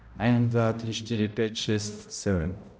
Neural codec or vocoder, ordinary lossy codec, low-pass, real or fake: codec, 16 kHz, 0.5 kbps, X-Codec, HuBERT features, trained on balanced general audio; none; none; fake